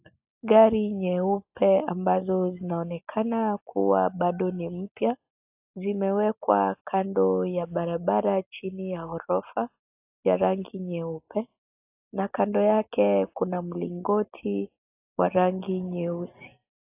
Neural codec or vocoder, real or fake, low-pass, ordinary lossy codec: none; real; 3.6 kHz; AAC, 32 kbps